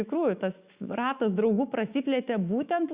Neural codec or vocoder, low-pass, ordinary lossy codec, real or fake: autoencoder, 48 kHz, 128 numbers a frame, DAC-VAE, trained on Japanese speech; 3.6 kHz; Opus, 64 kbps; fake